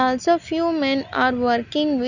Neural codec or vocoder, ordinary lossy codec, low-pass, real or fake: none; none; 7.2 kHz; real